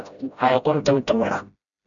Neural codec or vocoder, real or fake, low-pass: codec, 16 kHz, 0.5 kbps, FreqCodec, smaller model; fake; 7.2 kHz